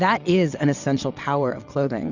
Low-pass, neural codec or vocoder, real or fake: 7.2 kHz; vocoder, 22.05 kHz, 80 mel bands, WaveNeXt; fake